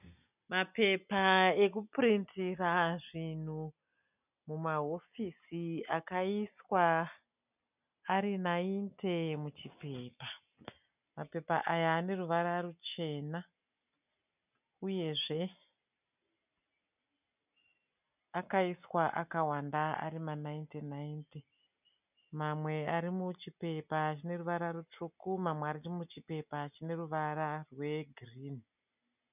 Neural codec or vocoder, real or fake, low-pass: none; real; 3.6 kHz